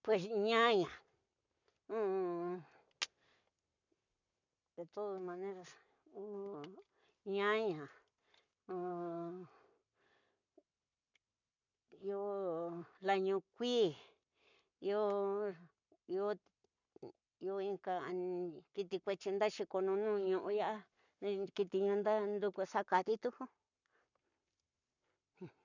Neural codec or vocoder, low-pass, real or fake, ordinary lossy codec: codec, 44.1 kHz, 7.8 kbps, Pupu-Codec; 7.2 kHz; fake; none